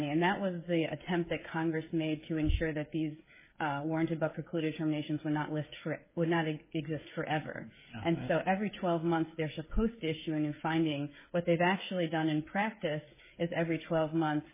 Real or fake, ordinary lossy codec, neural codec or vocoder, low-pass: fake; MP3, 16 kbps; codec, 16 kHz, 16 kbps, FreqCodec, smaller model; 3.6 kHz